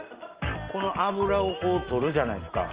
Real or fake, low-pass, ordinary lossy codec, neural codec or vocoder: real; 3.6 kHz; Opus, 32 kbps; none